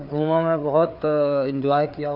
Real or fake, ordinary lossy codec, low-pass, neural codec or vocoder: fake; none; 5.4 kHz; codec, 16 kHz, 4 kbps, FreqCodec, larger model